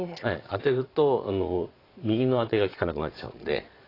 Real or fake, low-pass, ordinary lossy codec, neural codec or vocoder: fake; 5.4 kHz; AAC, 24 kbps; vocoder, 22.05 kHz, 80 mel bands, Vocos